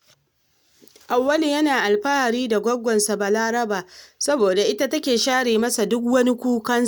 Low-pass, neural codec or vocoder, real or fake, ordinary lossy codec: none; none; real; none